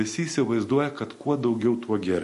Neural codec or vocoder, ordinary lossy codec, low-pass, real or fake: none; MP3, 48 kbps; 14.4 kHz; real